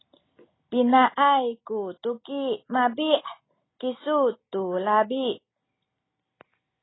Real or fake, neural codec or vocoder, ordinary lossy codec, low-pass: real; none; AAC, 16 kbps; 7.2 kHz